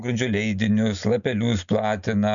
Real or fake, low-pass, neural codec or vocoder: real; 7.2 kHz; none